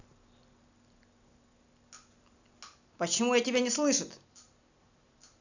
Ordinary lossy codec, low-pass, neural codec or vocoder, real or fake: none; 7.2 kHz; none; real